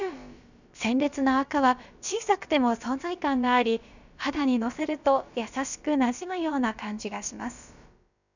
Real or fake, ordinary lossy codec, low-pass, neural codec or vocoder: fake; none; 7.2 kHz; codec, 16 kHz, about 1 kbps, DyCAST, with the encoder's durations